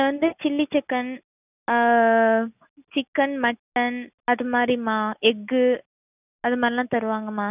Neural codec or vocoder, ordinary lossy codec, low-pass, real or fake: none; none; 3.6 kHz; real